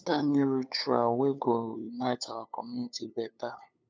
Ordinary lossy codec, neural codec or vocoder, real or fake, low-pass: none; codec, 16 kHz, 8 kbps, FunCodec, trained on LibriTTS, 25 frames a second; fake; none